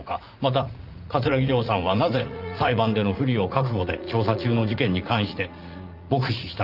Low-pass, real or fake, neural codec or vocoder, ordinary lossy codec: 5.4 kHz; fake; vocoder, 44.1 kHz, 80 mel bands, Vocos; Opus, 32 kbps